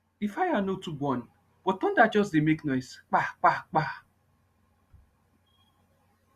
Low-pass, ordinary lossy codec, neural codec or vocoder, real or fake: 14.4 kHz; Opus, 64 kbps; none; real